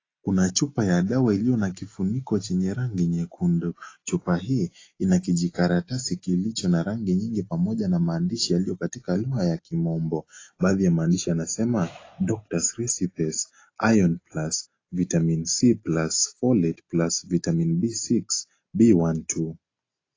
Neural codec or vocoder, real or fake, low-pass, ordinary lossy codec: none; real; 7.2 kHz; AAC, 32 kbps